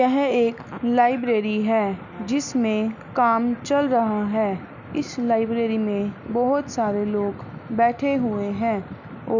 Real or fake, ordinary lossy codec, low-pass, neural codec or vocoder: real; none; 7.2 kHz; none